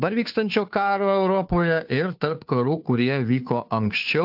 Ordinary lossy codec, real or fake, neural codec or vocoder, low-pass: AAC, 48 kbps; fake; codec, 16 kHz, 2 kbps, FunCodec, trained on Chinese and English, 25 frames a second; 5.4 kHz